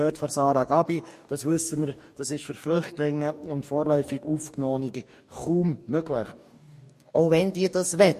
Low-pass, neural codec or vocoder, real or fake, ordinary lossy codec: 14.4 kHz; codec, 44.1 kHz, 2.6 kbps, DAC; fake; MP3, 64 kbps